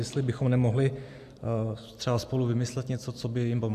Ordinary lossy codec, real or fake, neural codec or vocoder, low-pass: AAC, 96 kbps; real; none; 14.4 kHz